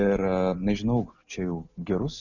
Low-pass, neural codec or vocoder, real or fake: 7.2 kHz; none; real